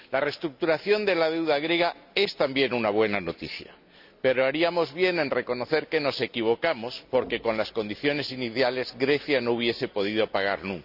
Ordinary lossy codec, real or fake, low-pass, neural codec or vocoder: none; real; 5.4 kHz; none